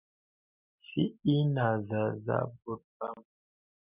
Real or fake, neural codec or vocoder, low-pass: real; none; 3.6 kHz